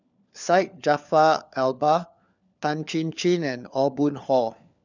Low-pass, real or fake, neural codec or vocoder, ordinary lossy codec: 7.2 kHz; fake; codec, 16 kHz, 4 kbps, FunCodec, trained on LibriTTS, 50 frames a second; none